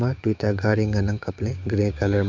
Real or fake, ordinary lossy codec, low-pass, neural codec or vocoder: fake; MP3, 64 kbps; 7.2 kHz; codec, 16 kHz, 6 kbps, DAC